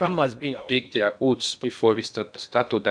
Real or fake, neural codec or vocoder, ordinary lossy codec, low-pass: fake; codec, 16 kHz in and 24 kHz out, 0.8 kbps, FocalCodec, streaming, 65536 codes; none; 9.9 kHz